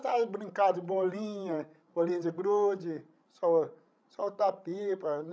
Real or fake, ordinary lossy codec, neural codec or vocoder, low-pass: fake; none; codec, 16 kHz, 16 kbps, FreqCodec, larger model; none